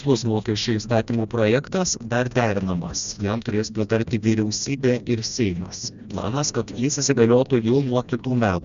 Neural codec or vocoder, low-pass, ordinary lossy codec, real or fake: codec, 16 kHz, 1 kbps, FreqCodec, smaller model; 7.2 kHz; Opus, 64 kbps; fake